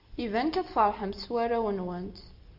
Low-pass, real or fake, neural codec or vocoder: 5.4 kHz; real; none